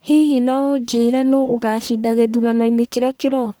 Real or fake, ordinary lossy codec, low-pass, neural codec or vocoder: fake; none; none; codec, 44.1 kHz, 1.7 kbps, Pupu-Codec